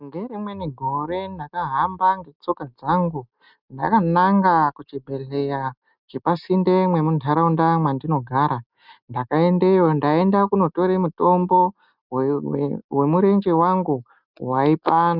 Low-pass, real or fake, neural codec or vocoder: 5.4 kHz; real; none